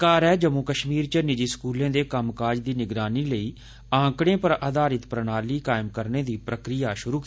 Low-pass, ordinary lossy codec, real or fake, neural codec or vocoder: none; none; real; none